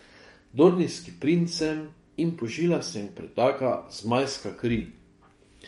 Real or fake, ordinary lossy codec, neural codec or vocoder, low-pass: fake; MP3, 48 kbps; codec, 44.1 kHz, 7.8 kbps, DAC; 19.8 kHz